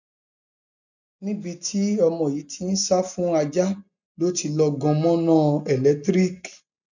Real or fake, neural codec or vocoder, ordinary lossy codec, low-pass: real; none; none; 7.2 kHz